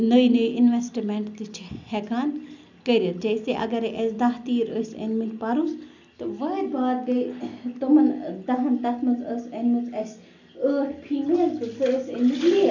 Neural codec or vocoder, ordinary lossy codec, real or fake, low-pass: none; none; real; 7.2 kHz